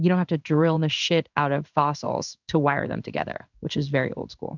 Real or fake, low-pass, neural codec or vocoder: fake; 7.2 kHz; codec, 16 kHz in and 24 kHz out, 1 kbps, XY-Tokenizer